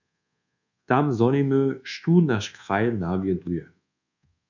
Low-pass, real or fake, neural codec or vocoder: 7.2 kHz; fake; codec, 24 kHz, 1.2 kbps, DualCodec